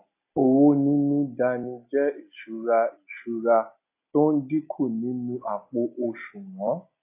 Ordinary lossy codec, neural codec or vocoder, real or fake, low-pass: AAC, 32 kbps; none; real; 3.6 kHz